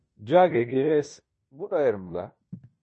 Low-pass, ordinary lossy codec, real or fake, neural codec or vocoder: 10.8 kHz; MP3, 32 kbps; fake; codec, 16 kHz in and 24 kHz out, 0.9 kbps, LongCat-Audio-Codec, fine tuned four codebook decoder